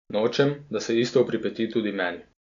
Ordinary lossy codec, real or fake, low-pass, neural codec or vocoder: none; real; 7.2 kHz; none